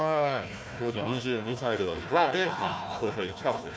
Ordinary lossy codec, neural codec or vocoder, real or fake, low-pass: none; codec, 16 kHz, 1 kbps, FunCodec, trained on Chinese and English, 50 frames a second; fake; none